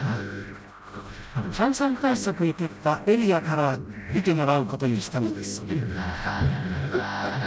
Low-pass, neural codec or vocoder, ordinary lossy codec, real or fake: none; codec, 16 kHz, 0.5 kbps, FreqCodec, smaller model; none; fake